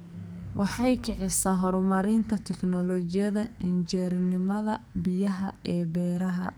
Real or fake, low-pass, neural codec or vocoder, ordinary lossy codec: fake; none; codec, 44.1 kHz, 2.6 kbps, SNAC; none